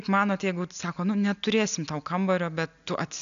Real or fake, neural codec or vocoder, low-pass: real; none; 7.2 kHz